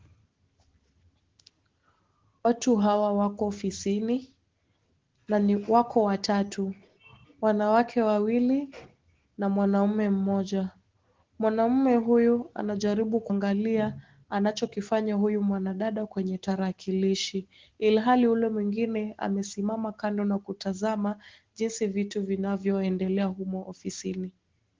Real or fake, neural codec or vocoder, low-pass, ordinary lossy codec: real; none; 7.2 kHz; Opus, 16 kbps